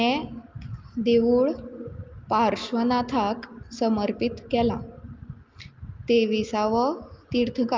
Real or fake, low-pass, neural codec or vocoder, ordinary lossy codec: real; 7.2 kHz; none; Opus, 32 kbps